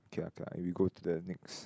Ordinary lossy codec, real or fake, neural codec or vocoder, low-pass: none; real; none; none